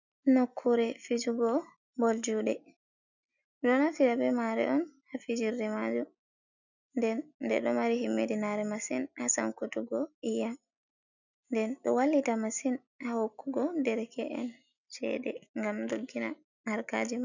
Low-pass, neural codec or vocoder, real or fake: 7.2 kHz; none; real